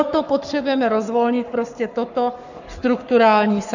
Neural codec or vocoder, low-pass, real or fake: codec, 44.1 kHz, 7.8 kbps, Pupu-Codec; 7.2 kHz; fake